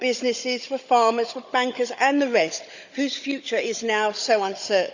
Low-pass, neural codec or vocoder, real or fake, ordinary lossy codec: 7.2 kHz; codec, 16 kHz, 16 kbps, FunCodec, trained on Chinese and English, 50 frames a second; fake; Opus, 64 kbps